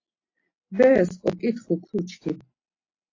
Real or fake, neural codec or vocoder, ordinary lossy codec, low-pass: real; none; AAC, 32 kbps; 7.2 kHz